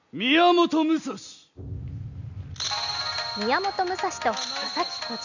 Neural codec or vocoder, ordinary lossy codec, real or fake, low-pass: none; none; real; 7.2 kHz